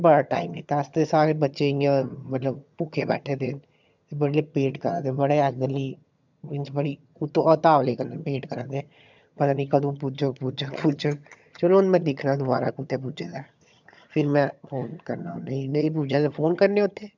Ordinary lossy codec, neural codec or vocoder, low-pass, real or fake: none; vocoder, 22.05 kHz, 80 mel bands, HiFi-GAN; 7.2 kHz; fake